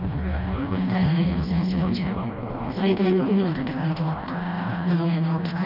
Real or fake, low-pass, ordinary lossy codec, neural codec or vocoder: fake; 5.4 kHz; none; codec, 16 kHz, 1 kbps, FreqCodec, smaller model